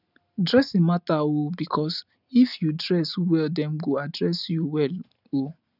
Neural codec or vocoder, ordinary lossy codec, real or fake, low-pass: none; none; real; 5.4 kHz